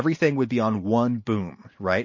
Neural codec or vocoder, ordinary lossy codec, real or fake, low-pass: none; MP3, 32 kbps; real; 7.2 kHz